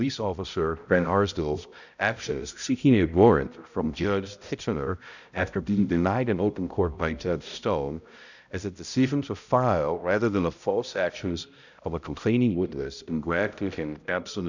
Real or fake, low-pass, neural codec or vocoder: fake; 7.2 kHz; codec, 16 kHz, 0.5 kbps, X-Codec, HuBERT features, trained on balanced general audio